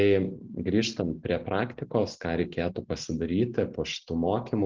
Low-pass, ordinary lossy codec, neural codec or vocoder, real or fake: 7.2 kHz; Opus, 16 kbps; none; real